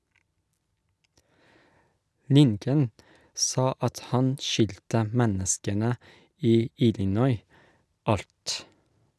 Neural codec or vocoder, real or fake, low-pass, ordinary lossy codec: none; real; none; none